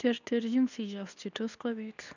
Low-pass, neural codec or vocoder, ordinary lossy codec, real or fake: 7.2 kHz; codec, 24 kHz, 0.9 kbps, WavTokenizer, medium speech release version 2; none; fake